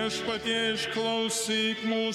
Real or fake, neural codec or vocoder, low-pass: fake; codec, 44.1 kHz, 7.8 kbps, Pupu-Codec; 19.8 kHz